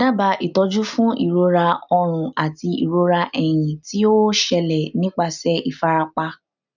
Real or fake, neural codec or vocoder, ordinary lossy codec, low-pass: real; none; none; 7.2 kHz